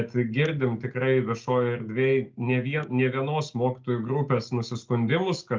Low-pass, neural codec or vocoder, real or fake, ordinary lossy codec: 7.2 kHz; none; real; Opus, 32 kbps